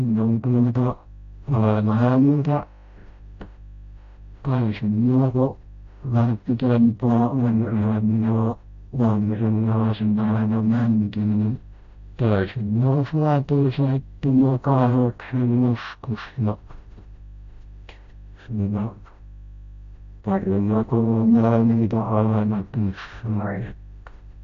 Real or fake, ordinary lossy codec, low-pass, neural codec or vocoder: fake; AAC, 48 kbps; 7.2 kHz; codec, 16 kHz, 0.5 kbps, FreqCodec, smaller model